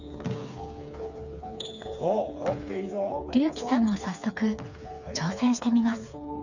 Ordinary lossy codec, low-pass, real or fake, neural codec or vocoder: none; 7.2 kHz; fake; codec, 16 kHz, 4 kbps, FreqCodec, smaller model